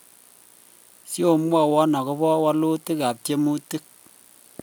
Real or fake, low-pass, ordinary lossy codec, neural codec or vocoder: real; none; none; none